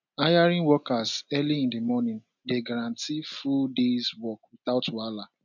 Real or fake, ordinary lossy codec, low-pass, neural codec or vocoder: real; none; 7.2 kHz; none